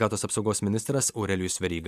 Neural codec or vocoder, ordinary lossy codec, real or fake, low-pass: none; MP3, 96 kbps; real; 14.4 kHz